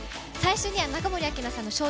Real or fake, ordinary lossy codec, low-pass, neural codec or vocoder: real; none; none; none